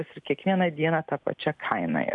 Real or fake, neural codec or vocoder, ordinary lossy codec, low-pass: real; none; MP3, 64 kbps; 10.8 kHz